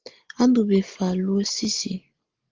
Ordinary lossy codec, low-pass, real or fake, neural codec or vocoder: Opus, 16 kbps; 7.2 kHz; real; none